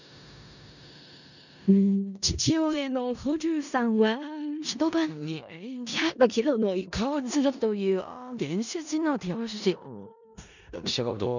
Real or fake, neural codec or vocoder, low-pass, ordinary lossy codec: fake; codec, 16 kHz in and 24 kHz out, 0.4 kbps, LongCat-Audio-Codec, four codebook decoder; 7.2 kHz; none